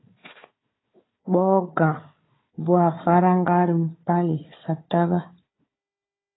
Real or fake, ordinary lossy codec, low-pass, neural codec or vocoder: fake; AAC, 16 kbps; 7.2 kHz; codec, 16 kHz, 4 kbps, FunCodec, trained on Chinese and English, 50 frames a second